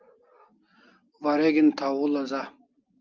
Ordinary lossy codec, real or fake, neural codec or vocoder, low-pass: Opus, 24 kbps; real; none; 7.2 kHz